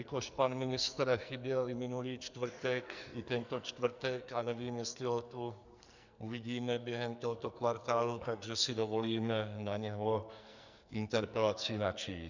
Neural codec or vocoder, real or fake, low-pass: codec, 44.1 kHz, 2.6 kbps, SNAC; fake; 7.2 kHz